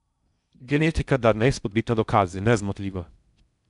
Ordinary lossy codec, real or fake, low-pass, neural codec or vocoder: none; fake; 10.8 kHz; codec, 16 kHz in and 24 kHz out, 0.6 kbps, FocalCodec, streaming, 4096 codes